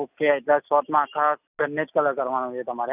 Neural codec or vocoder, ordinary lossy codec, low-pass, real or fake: none; none; 3.6 kHz; real